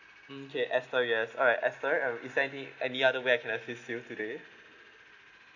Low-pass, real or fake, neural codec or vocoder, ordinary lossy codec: 7.2 kHz; real; none; none